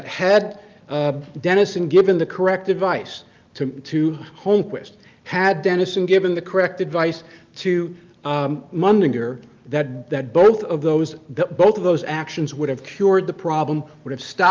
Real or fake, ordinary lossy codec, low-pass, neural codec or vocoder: real; Opus, 24 kbps; 7.2 kHz; none